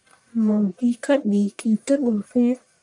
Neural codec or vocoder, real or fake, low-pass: codec, 44.1 kHz, 1.7 kbps, Pupu-Codec; fake; 10.8 kHz